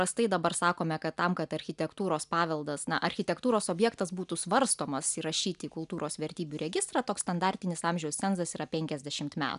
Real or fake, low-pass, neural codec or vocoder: real; 10.8 kHz; none